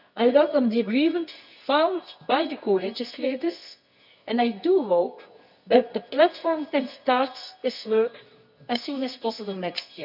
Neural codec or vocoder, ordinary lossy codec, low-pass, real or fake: codec, 24 kHz, 0.9 kbps, WavTokenizer, medium music audio release; none; 5.4 kHz; fake